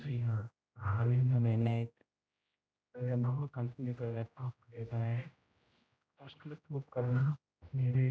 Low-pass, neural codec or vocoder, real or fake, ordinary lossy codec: none; codec, 16 kHz, 0.5 kbps, X-Codec, HuBERT features, trained on general audio; fake; none